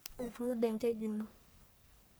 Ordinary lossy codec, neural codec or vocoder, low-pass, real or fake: none; codec, 44.1 kHz, 1.7 kbps, Pupu-Codec; none; fake